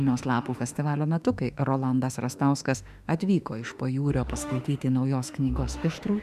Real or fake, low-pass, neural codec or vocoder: fake; 14.4 kHz; autoencoder, 48 kHz, 32 numbers a frame, DAC-VAE, trained on Japanese speech